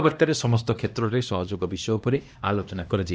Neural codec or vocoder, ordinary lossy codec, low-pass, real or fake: codec, 16 kHz, 1 kbps, X-Codec, HuBERT features, trained on LibriSpeech; none; none; fake